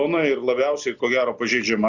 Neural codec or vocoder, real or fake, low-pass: none; real; 7.2 kHz